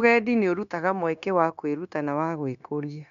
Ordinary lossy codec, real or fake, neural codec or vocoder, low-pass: none; fake; codec, 16 kHz, 0.9 kbps, LongCat-Audio-Codec; 7.2 kHz